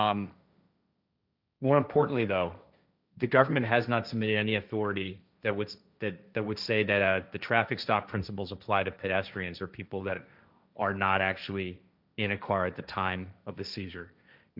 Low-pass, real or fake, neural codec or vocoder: 5.4 kHz; fake; codec, 16 kHz, 1.1 kbps, Voila-Tokenizer